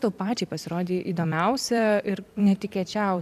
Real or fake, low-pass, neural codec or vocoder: fake; 14.4 kHz; vocoder, 44.1 kHz, 128 mel bands every 256 samples, BigVGAN v2